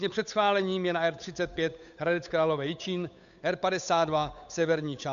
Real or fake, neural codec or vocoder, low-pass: fake; codec, 16 kHz, 8 kbps, FunCodec, trained on Chinese and English, 25 frames a second; 7.2 kHz